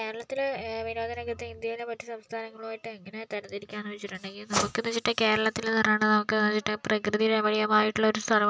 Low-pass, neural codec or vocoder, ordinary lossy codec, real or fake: none; none; none; real